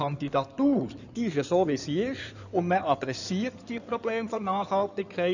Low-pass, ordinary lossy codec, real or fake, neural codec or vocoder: 7.2 kHz; none; fake; codec, 16 kHz in and 24 kHz out, 2.2 kbps, FireRedTTS-2 codec